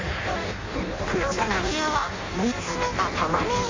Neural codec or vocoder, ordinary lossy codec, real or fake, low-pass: codec, 16 kHz in and 24 kHz out, 0.6 kbps, FireRedTTS-2 codec; AAC, 32 kbps; fake; 7.2 kHz